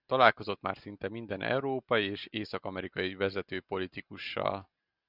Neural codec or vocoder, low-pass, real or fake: none; 5.4 kHz; real